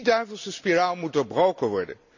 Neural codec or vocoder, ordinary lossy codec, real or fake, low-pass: none; none; real; 7.2 kHz